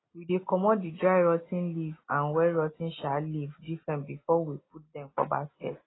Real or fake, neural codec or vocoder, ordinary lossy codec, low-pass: real; none; AAC, 16 kbps; 7.2 kHz